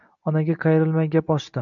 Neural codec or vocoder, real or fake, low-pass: none; real; 7.2 kHz